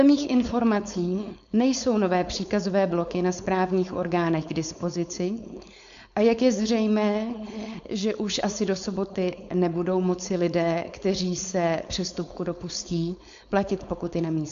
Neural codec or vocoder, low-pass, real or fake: codec, 16 kHz, 4.8 kbps, FACodec; 7.2 kHz; fake